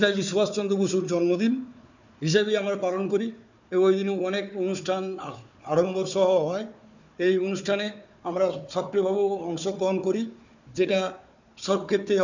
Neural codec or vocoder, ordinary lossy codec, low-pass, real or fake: codec, 16 kHz, 4 kbps, FunCodec, trained on Chinese and English, 50 frames a second; none; 7.2 kHz; fake